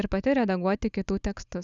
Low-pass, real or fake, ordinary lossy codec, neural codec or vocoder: 7.2 kHz; real; Opus, 64 kbps; none